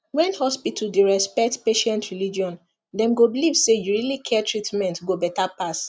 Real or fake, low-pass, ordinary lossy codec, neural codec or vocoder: real; none; none; none